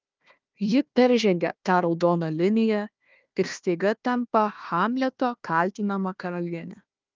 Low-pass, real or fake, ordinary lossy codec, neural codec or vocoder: 7.2 kHz; fake; Opus, 24 kbps; codec, 16 kHz, 1 kbps, FunCodec, trained on Chinese and English, 50 frames a second